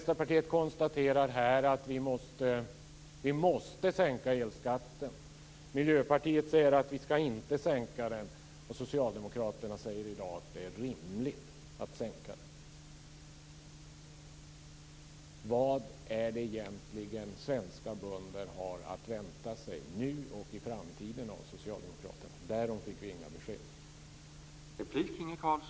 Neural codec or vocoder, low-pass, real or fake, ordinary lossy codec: none; none; real; none